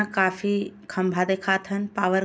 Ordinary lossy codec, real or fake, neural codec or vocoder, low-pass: none; real; none; none